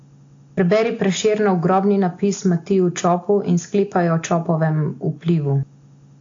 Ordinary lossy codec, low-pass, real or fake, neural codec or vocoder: AAC, 48 kbps; 7.2 kHz; real; none